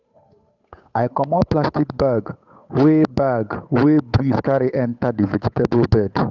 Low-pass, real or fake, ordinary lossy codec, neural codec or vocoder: 7.2 kHz; fake; none; codec, 16 kHz, 8 kbps, FunCodec, trained on Chinese and English, 25 frames a second